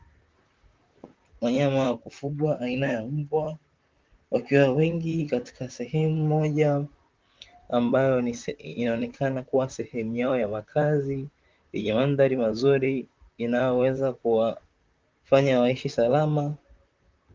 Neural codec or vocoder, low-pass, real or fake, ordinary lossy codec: vocoder, 44.1 kHz, 128 mel bands, Pupu-Vocoder; 7.2 kHz; fake; Opus, 32 kbps